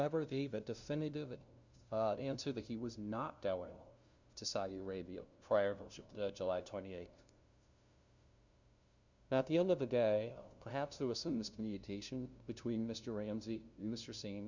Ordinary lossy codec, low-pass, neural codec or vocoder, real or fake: Opus, 64 kbps; 7.2 kHz; codec, 16 kHz, 0.5 kbps, FunCodec, trained on LibriTTS, 25 frames a second; fake